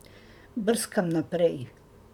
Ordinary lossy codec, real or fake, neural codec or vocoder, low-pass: none; fake; vocoder, 44.1 kHz, 128 mel bands, Pupu-Vocoder; 19.8 kHz